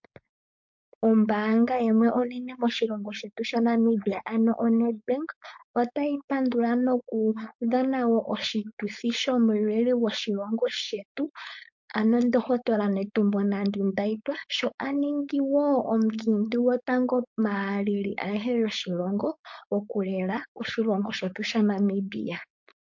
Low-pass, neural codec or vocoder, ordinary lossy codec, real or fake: 7.2 kHz; codec, 16 kHz, 4.8 kbps, FACodec; MP3, 48 kbps; fake